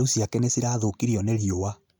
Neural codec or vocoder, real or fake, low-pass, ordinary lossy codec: none; real; none; none